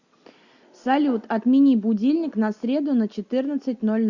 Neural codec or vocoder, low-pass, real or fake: none; 7.2 kHz; real